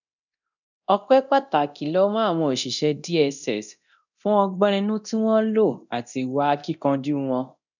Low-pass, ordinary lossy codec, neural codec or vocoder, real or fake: 7.2 kHz; none; codec, 24 kHz, 0.9 kbps, DualCodec; fake